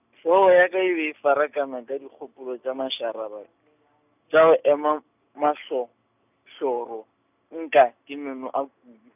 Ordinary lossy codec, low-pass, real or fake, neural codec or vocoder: none; 3.6 kHz; real; none